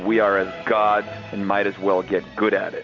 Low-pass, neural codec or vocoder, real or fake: 7.2 kHz; none; real